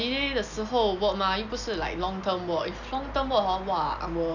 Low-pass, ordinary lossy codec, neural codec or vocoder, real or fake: 7.2 kHz; none; none; real